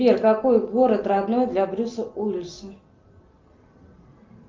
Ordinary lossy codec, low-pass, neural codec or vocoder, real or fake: Opus, 24 kbps; 7.2 kHz; none; real